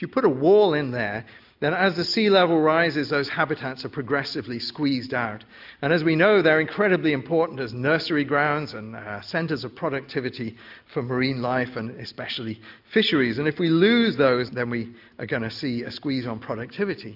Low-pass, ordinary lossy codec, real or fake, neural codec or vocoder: 5.4 kHz; AAC, 48 kbps; real; none